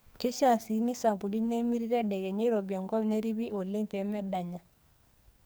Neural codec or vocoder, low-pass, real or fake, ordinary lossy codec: codec, 44.1 kHz, 2.6 kbps, SNAC; none; fake; none